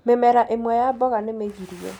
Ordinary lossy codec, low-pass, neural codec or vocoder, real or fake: none; none; none; real